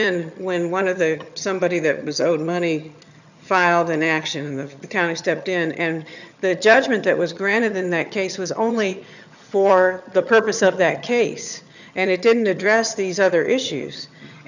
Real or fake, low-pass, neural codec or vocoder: fake; 7.2 kHz; vocoder, 22.05 kHz, 80 mel bands, HiFi-GAN